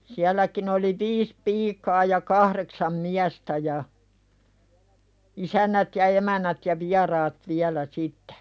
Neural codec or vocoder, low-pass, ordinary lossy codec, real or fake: none; none; none; real